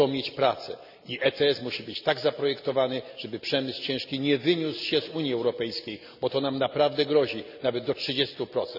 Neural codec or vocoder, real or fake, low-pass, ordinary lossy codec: none; real; 5.4 kHz; none